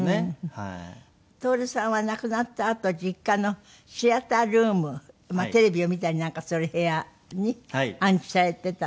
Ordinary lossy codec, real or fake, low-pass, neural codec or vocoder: none; real; none; none